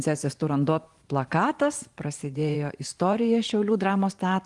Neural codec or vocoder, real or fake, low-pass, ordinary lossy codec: vocoder, 44.1 kHz, 128 mel bands every 512 samples, BigVGAN v2; fake; 10.8 kHz; Opus, 24 kbps